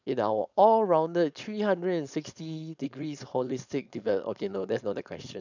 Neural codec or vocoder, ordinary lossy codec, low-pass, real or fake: codec, 16 kHz, 4.8 kbps, FACodec; none; 7.2 kHz; fake